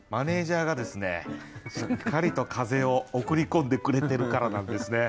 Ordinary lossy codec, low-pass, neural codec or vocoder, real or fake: none; none; none; real